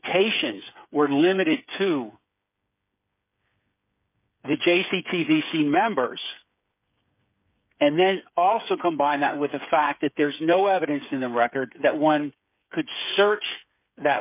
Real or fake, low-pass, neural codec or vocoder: fake; 3.6 kHz; codec, 16 kHz, 8 kbps, FreqCodec, smaller model